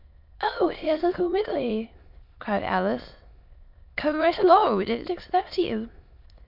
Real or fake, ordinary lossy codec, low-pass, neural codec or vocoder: fake; MP3, 48 kbps; 5.4 kHz; autoencoder, 22.05 kHz, a latent of 192 numbers a frame, VITS, trained on many speakers